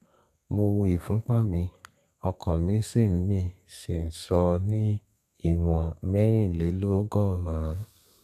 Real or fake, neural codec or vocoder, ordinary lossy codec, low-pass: fake; codec, 32 kHz, 1.9 kbps, SNAC; none; 14.4 kHz